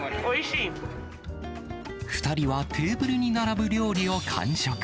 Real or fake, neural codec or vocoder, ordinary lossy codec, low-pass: real; none; none; none